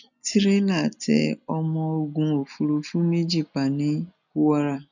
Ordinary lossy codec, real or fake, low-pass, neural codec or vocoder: none; real; 7.2 kHz; none